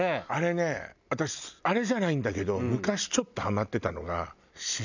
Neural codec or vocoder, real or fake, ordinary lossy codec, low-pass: none; real; none; 7.2 kHz